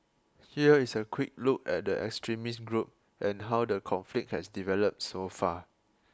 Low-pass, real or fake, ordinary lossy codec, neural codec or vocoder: none; real; none; none